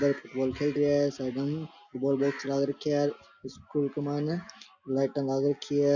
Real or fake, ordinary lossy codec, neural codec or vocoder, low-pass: real; none; none; 7.2 kHz